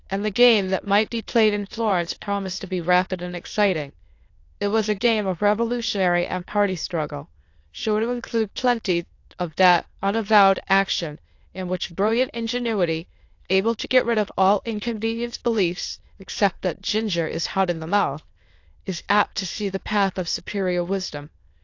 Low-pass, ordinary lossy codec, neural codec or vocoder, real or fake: 7.2 kHz; AAC, 48 kbps; autoencoder, 22.05 kHz, a latent of 192 numbers a frame, VITS, trained on many speakers; fake